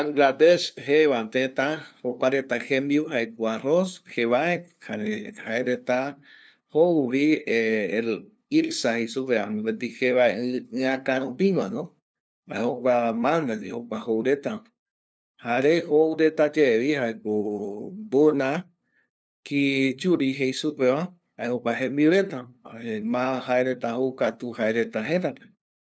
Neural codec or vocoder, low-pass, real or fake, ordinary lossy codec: codec, 16 kHz, 2 kbps, FunCodec, trained on LibriTTS, 25 frames a second; none; fake; none